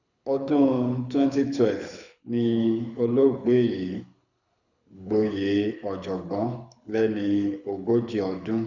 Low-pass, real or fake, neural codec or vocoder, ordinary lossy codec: 7.2 kHz; fake; codec, 24 kHz, 6 kbps, HILCodec; none